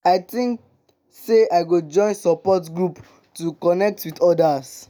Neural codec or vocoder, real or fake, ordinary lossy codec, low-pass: none; real; none; none